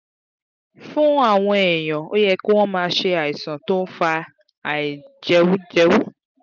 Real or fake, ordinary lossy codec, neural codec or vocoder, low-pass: real; none; none; 7.2 kHz